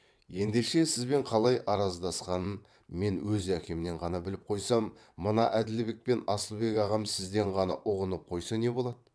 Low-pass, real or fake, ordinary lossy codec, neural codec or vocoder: none; fake; none; vocoder, 22.05 kHz, 80 mel bands, WaveNeXt